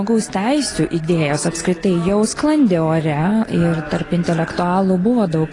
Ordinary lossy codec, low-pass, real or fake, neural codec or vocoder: AAC, 32 kbps; 10.8 kHz; real; none